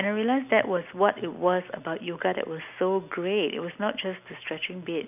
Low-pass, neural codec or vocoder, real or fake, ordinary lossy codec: 3.6 kHz; none; real; none